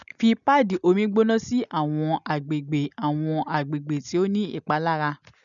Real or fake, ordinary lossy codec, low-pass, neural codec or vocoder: real; none; 7.2 kHz; none